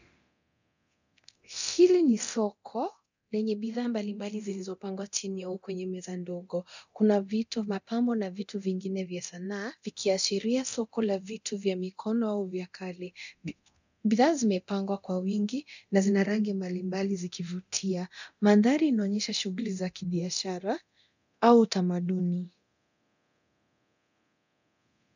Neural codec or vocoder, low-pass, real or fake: codec, 24 kHz, 0.9 kbps, DualCodec; 7.2 kHz; fake